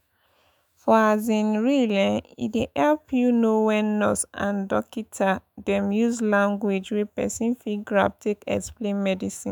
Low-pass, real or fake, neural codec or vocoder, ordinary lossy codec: 19.8 kHz; fake; autoencoder, 48 kHz, 128 numbers a frame, DAC-VAE, trained on Japanese speech; none